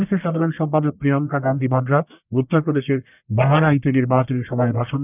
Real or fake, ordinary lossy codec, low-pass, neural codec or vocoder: fake; none; 3.6 kHz; codec, 44.1 kHz, 1.7 kbps, Pupu-Codec